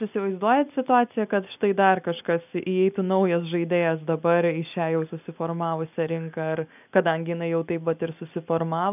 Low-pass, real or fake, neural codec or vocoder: 3.6 kHz; real; none